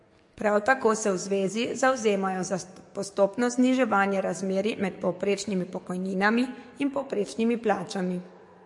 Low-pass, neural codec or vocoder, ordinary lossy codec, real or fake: 10.8 kHz; codec, 44.1 kHz, 7.8 kbps, DAC; MP3, 48 kbps; fake